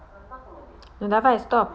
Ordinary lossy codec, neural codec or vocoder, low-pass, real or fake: none; none; none; real